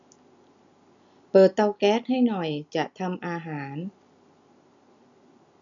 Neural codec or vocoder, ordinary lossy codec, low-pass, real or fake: none; none; 7.2 kHz; real